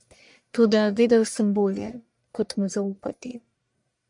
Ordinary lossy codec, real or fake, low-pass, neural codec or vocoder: MP3, 64 kbps; fake; 10.8 kHz; codec, 44.1 kHz, 1.7 kbps, Pupu-Codec